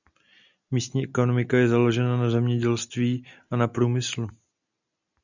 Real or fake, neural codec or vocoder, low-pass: real; none; 7.2 kHz